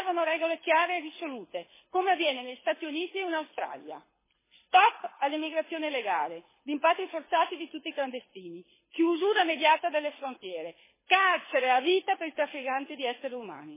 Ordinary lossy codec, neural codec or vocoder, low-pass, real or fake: MP3, 16 kbps; codec, 24 kHz, 6 kbps, HILCodec; 3.6 kHz; fake